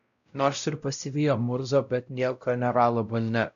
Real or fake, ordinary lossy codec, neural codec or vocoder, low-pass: fake; MP3, 96 kbps; codec, 16 kHz, 0.5 kbps, X-Codec, WavLM features, trained on Multilingual LibriSpeech; 7.2 kHz